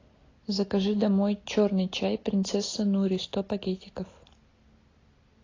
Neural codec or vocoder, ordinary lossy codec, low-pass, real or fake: none; AAC, 32 kbps; 7.2 kHz; real